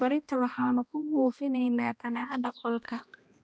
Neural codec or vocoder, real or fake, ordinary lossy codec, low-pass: codec, 16 kHz, 1 kbps, X-Codec, HuBERT features, trained on balanced general audio; fake; none; none